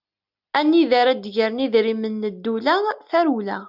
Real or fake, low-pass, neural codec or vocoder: real; 5.4 kHz; none